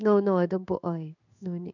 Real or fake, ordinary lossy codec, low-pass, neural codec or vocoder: fake; none; 7.2 kHz; codec, 16 kHz in and 24 kHz out, 1 kbps, XY-Tokenizer